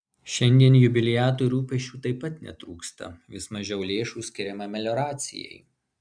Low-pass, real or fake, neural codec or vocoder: 9.9 kHz; real; none